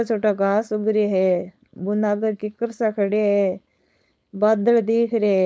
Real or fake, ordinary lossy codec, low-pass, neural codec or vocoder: fake; none; none; codec, 16 kHz, 4.8 kbps, FACodec